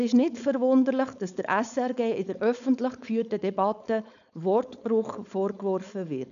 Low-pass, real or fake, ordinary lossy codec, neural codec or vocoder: 7.2 kHz; fake; none; codec, 16 kHz, 4.8 kbps, FACodec